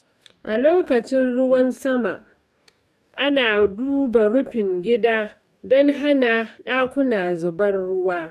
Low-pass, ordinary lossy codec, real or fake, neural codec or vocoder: 14.4 kHz; none; fake; codec, 44.1 kHz, 2.6 kbps, DAC